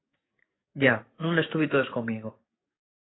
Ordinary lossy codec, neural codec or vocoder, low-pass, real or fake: AAC, 16 kbps; codec, 44.1 kHz, 7.8 kbps, DAC; 7.2 kHz; fake